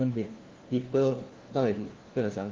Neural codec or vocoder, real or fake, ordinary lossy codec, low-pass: codec, 16 kHz, 1 kbps, FunCodec, trained on LibriTTS, 50 frames a second; fake; Opus, 16 kbps; 7.2 kHz